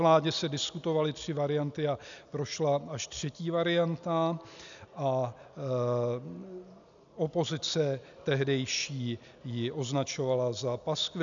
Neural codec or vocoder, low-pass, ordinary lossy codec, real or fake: none; 7.2 kHz; MP3, 96 kbps; real